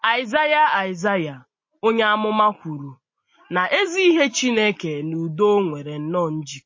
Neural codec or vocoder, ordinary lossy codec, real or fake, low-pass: none; MP3, 32 kbps; real; 7.2 kHz